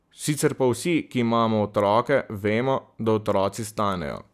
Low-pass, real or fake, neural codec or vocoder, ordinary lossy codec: 14.4 kHz; real; none; none